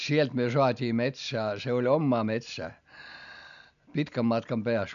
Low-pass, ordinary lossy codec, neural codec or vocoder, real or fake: 7.2 kHz; MP3, 96 kbps; none; real